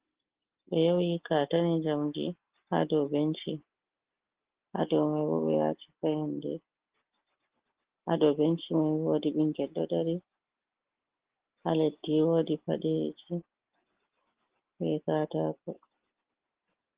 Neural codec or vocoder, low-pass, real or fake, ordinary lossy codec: none; 3.6 kHz; real; Opus, 16 kbps